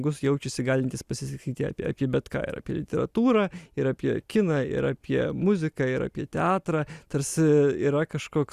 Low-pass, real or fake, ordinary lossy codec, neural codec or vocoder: 14.4 kHz; real; Opus, 64 kbps; none